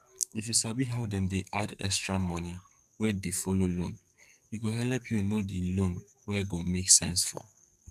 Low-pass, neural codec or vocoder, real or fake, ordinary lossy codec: 14.4 kHz; codec, 44.1 kHz, 2.6 kbps, SNAC; fake; none